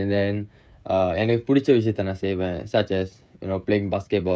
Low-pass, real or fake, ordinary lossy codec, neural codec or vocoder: none; fake; none; codec, 16 kHz, 16 kbps, FreqCodec, smaller model